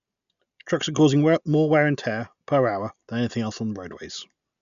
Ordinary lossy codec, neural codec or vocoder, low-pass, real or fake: none; none; 7.2 kHz; real